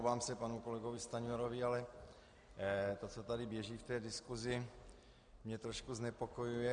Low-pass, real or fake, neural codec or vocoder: 9.9 kHz; real; none